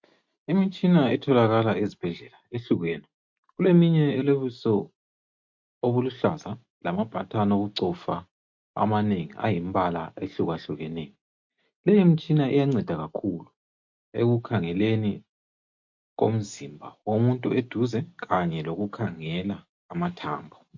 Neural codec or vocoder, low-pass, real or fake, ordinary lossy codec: none; 7.2 kHz; real; MP3, 48 kbps